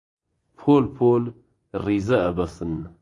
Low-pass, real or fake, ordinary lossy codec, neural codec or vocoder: 10.8 kHz; fake; AAC, 48 kbps; vocoder, 24 kHz, 100 mel bands, Vocos